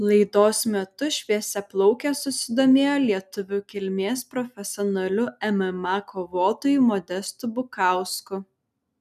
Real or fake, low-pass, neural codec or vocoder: real; 14.4 kHz; none